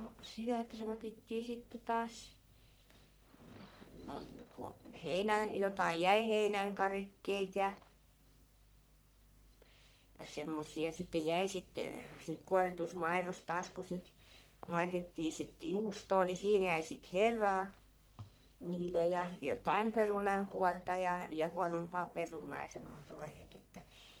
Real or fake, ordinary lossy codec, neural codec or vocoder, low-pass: fake; none; codec, 44.1 kHz, 1.7 kbps, Pupu-Codec; none